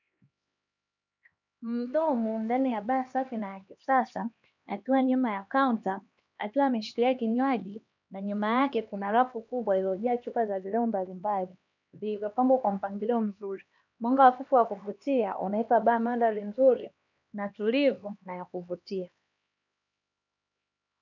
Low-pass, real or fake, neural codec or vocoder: 7.2 kHz; fake; codec, 16 kHz, 2 kbps, X-Codec, HuBERT features, trained on LibriSpeech